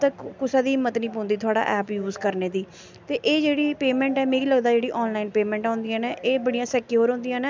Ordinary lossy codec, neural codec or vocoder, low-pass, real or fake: none; none; none; real